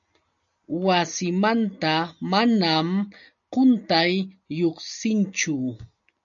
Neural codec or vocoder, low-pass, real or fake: none; 7.2 kHz; real